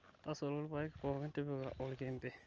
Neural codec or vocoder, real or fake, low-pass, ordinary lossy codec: none; real; 7.2 kHz; Opus, 24 kbps